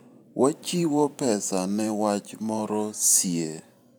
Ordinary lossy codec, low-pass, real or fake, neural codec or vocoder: none; none; fake; vocoder, 44.1 kHz, 128 mel bands every 512 samples, BigVGAN v2